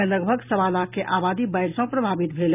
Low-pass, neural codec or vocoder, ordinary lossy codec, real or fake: 3.6 kHz; none; none; real